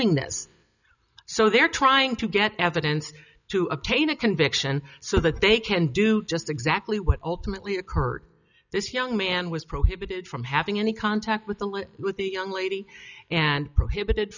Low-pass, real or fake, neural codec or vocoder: 7.2 kHz; real; none